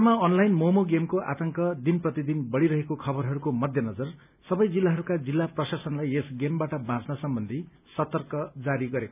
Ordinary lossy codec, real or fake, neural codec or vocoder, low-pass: none; real; none; 3.6 kHz